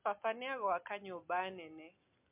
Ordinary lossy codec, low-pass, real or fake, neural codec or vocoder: MP3, 32 kbps; 3.6 kHz; real; none